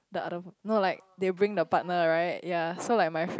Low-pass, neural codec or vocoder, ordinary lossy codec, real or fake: none; none; none; real